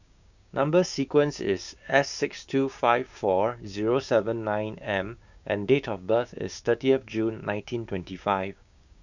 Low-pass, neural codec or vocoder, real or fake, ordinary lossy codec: 7.2 kHz; codec, 16 kHz, 6 kbps, DAC; fake; none